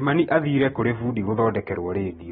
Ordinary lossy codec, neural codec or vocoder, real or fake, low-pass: AAC, 16 kbps; none; real; 19.8 kHz